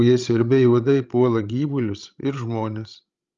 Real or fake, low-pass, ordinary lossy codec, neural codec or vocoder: fake; 7.2 kHz; Opus, 32 kbps; codec, 16 kHz, 16 kbps, FreqCodec, larger model